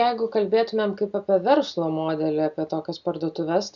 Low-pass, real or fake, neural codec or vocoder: 7.2 kHz; real; none